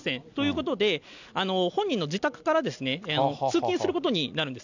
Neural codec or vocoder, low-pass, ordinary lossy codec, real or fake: none; 7.2 kHz; none; real